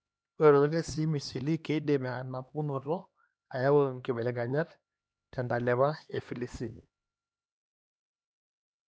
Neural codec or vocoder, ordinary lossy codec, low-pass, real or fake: codec, 16 kHz, 2 kbps, X-Codec, HuBERT features, trained on LibriSpeech; none; none; fake